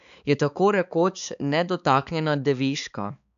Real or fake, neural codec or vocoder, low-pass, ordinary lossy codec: fake; codec, 16 kHz, 4 kbps, X-Codec, HuBERT features, trained on balanced general audio; 7.2 kHz; none